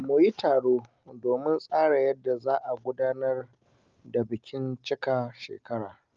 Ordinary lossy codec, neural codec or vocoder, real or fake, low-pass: Opus, 24 kbps; none; real; 7.2 kHz